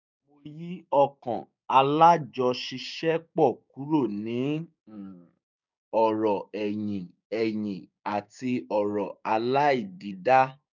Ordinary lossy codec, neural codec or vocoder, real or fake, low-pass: none; codec, 16 kHz, 6 kbps, DAC; fake; 7.2 kHz